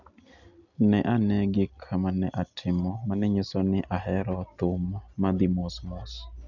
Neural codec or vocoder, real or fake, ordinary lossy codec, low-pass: none; real; none; 7.2 kHz